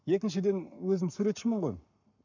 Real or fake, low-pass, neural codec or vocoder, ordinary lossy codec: fake; 7.2 kHz; codec, 44.1 kHz, 7.8 kbps, Pupu-Codec; none